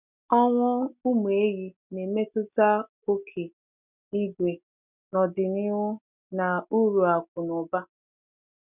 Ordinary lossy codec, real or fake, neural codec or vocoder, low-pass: none; real; none; 3.6 kHz